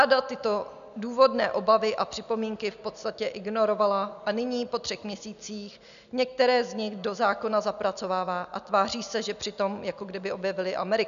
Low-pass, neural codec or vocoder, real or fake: 7.2 kHz; none; real